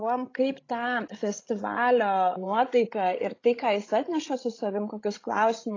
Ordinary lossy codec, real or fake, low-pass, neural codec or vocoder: AAC, 32 kbps; fake; 7.2 kHz; codec, 16 kHz, 16 kbps, FreqCodec, larger model